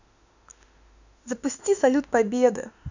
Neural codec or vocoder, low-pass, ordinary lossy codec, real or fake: autoencoder, 48 kHz, 32 numbers a frame, DAC-VAE, trained on Japanese speech; 7.2 kHz; none; fake